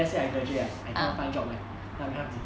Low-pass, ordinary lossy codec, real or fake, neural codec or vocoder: none; none; real; none